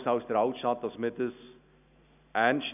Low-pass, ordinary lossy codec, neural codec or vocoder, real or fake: 3.6 kHz; none; none; real